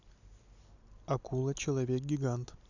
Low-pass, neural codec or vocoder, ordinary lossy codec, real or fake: 7.2 kHz; none; none; real